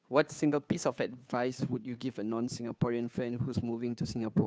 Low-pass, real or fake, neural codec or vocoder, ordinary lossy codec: none; fake; codec, 16 kHz, 2 kbps, FunCodec, trained on Chinese and English, 25 frames a second; none